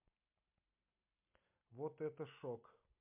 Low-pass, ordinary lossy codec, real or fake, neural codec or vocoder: 3.6 kHz; MP3, 32 kbps; real; none